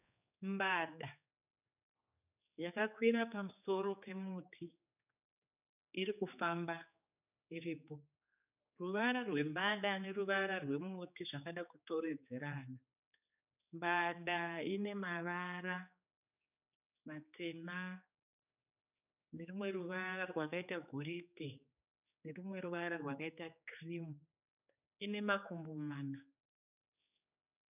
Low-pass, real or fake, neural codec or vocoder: 3.6 kHz; fake; codec, 16 kHz, 4 kbps, X-Codec, HuBERT features, trained on general audio